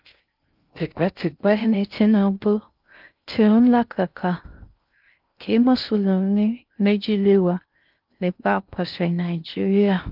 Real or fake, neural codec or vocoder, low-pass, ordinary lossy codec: fake; codec, 16 kHz in and 24 kHz out, 0.6 kbps, FocalCodec, streaming, 2048 codes; 5.4 kHz; Opus, 24 kbps